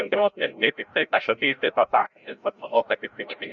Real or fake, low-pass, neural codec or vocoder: fake; 7.2 kHz; codec, 16 kHz, 0.5 kbps, FreqCodec, larger model